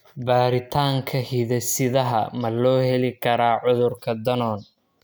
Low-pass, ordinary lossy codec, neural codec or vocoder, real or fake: none; none; none; real